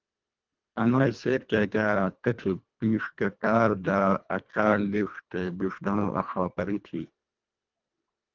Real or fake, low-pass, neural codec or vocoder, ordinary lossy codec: fake; 7.2 kHz; codec, 24 kHz, 1.5 kbps, HILCodec; Opus, 24 kbps